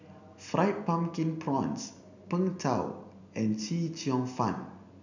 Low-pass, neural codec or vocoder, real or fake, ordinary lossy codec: 7.2 kHz; none; real; none